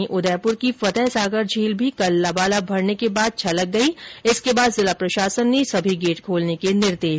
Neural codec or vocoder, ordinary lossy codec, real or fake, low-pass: none; none; real; none